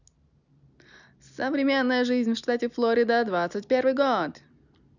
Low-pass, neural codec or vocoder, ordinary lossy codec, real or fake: 7.2 kHz; none; none; real